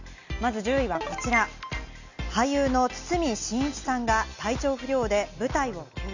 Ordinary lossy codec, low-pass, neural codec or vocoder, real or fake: none; 7.2 kHz; none; real